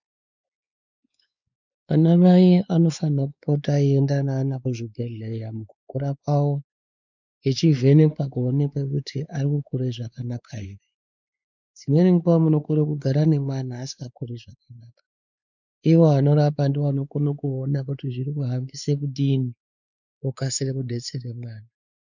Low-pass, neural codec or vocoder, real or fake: 7.2 kHz; codec, 16 kHz, 4 kbps, X-Codec, WavLM features, trained on Multilingual LibriSpeech; fake